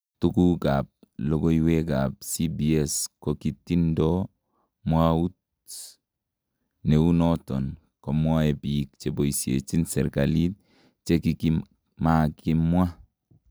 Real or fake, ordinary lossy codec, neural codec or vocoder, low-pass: real; none; none; none